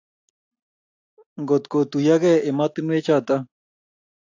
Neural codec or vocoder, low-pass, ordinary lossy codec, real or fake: none; 7.2 kHz; AAC, 48 kbps; real